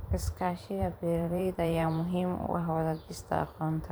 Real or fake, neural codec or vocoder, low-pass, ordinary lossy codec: fake; vocoder, 44.1 kHz, 128 mel bands every 512 samples, BigVGAN v2; none; none